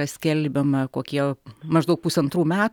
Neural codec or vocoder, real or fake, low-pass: none; real; 19.8 kHz